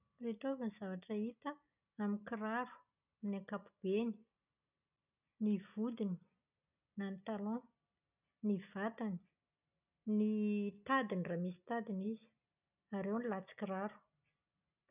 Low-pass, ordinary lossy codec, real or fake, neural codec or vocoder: 3.6 kHz; none; real; none